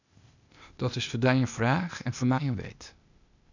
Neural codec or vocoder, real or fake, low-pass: codec, 16 kHz, 0.8 kbps, ZipCodec; fake; 7.2 kHz